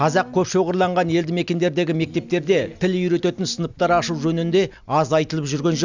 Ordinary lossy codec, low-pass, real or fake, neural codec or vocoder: none; 7.2 kHz; real; none